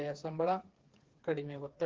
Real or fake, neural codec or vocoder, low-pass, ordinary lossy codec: fake; codec, 16 kHz, 4 kbps, FreqCodec, smaller model; 7.2 kHz; Opus, 16 kbps